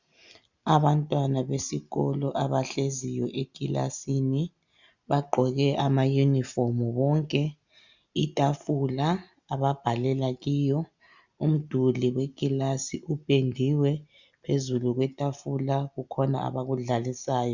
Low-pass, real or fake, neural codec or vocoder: 7.2 kHz; real; none